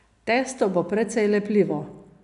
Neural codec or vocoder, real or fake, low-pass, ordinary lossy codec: none; real; 10.8 kHz; none